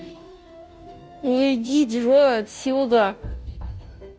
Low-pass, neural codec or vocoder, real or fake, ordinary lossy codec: none; codec, 16 kHz, 0.5 kbps, FunCodec, trained on Chinese and English, 25 frames a second; fake; none